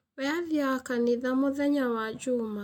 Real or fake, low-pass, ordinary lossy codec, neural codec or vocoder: real; 19.8 kHz; MP3, 96 kbps; none